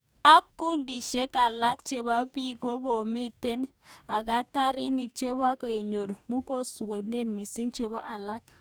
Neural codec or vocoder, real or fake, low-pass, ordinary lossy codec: codec, 44.1 kHz, 2.6 kbps, DAC; fake; none; none